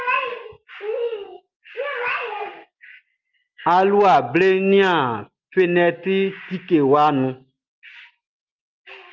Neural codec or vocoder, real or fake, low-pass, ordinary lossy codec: none; real; 7.2 kHz; Opus, 32 kbps